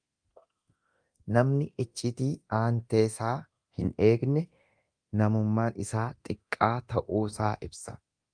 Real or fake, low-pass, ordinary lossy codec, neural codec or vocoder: fake; 9.9 kHz; Opus, 32 kbps; codec, 24 kHz, 0.9 kbps, DualCodec